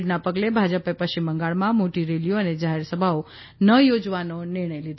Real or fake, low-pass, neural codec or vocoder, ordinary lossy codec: real; 7.2 kHz; none; MP3, 24 kbps